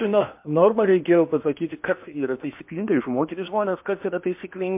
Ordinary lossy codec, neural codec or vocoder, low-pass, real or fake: MP3, 32 kbps; codec, 16 kHz in and 24 kHz out, 0.8 kbps, FocalCodec, streaming, 65536 codes; 3.6 kHz; fake